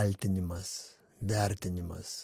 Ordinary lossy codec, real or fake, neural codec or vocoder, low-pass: Opus, 24 kbps; real; none; 14.4 kHz